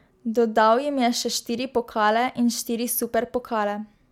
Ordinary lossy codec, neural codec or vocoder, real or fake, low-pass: MP3, 96 kbps; none; real; 19.8 kHz